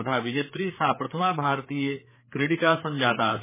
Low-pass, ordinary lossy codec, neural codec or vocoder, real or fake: 3.6 kHz; MP3, 16 kbps; codec, 16 kHz, 4 kbps, FreqCodec, larger model; fake